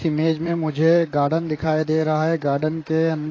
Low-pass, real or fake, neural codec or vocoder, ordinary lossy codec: 7.2 kHz; fake; vocoder, 44.1 kHz, 128 mel bands, Pupu-Vocoder; AAC, 32 kbps